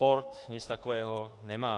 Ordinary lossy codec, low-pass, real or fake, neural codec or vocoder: AAC, 48 kbps; 10.8 kHz; fake; autoencoder, 48 kHz, 32 numbers a frame, DAC-VAE, trained on Japanese speech